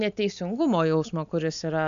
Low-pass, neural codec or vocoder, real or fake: 7.2 kHz; none; real